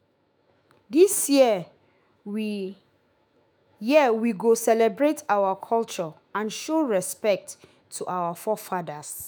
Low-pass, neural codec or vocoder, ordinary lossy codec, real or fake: none; autoencoder, 48 kHz, 128 numbers a frame, DAC-VAE, trained on Japanese speech; none; fake